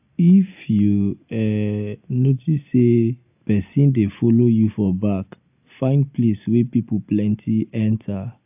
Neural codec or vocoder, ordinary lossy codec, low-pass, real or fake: none; AAC, 32 kbps; 3.6 kHz; real